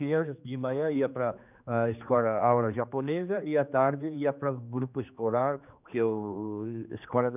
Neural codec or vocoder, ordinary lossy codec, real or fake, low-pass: codec, 16 kHz, 2 kbps, X-Codec, HuBERT features, trained on general audio; none; fake; 3.6 kHz